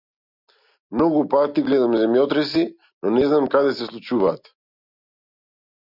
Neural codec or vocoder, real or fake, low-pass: none; real; 5.4 kHz